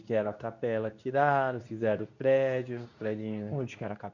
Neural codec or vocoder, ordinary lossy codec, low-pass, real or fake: codec, 24 kHz, 0.9 kbps, WavTokenizer, medium speech release version 2; none; 7.2 kHz; fake